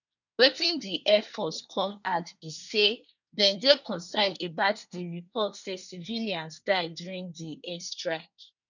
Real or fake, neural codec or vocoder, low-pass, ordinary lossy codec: fake; codec, 24 kHz, 1 kbps, SNAC; 7.2 kHz; none